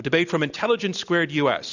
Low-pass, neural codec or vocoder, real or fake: 7.2 kHz; none; real